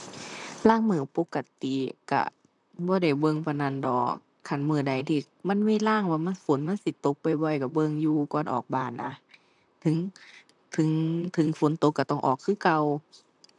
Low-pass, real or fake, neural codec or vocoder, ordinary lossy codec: 10.8 kHz; fake; vocoder, 44.1 kHz, 128 mel bands, Pupu-Vocoder; none